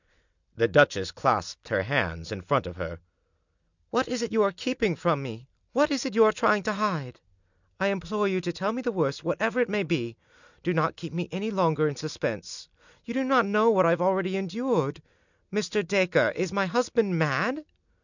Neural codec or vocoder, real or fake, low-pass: none; real; 7.2 kHz